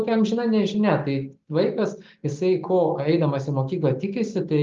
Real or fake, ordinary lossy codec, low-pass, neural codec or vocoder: real; Opus, 32 kbps; 7.2 kHz; none